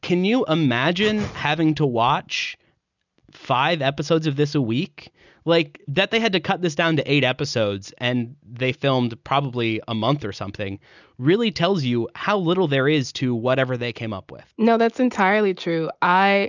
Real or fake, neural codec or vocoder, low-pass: real; none; 7.2 kHz